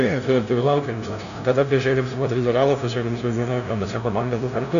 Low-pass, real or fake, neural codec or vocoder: 7.2 kHz; fake; codec, 16 kHz, 0.5 kbps, FunCodec, trained on LibriTTS, 25 frames a second